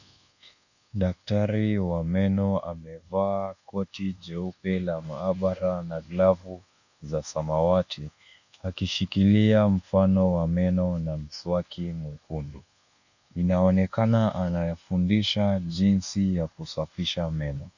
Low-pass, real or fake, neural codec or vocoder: 7.2 kHz; fake; codec, 24 kHz, 1.2 kbps, DualCodec